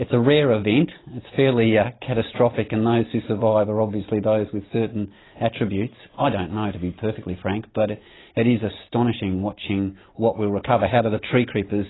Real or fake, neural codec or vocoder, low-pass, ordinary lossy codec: real; none; 7.2 kHz; AAC, 16 kbps